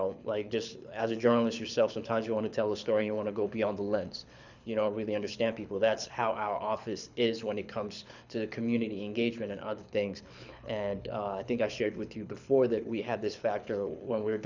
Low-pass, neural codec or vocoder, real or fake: 7.2 kHz; codec, 24 kHz, 6 kbps, HILCodec; fake